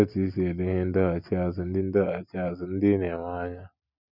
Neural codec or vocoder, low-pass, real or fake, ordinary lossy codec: none; 5.4 kHz; real; none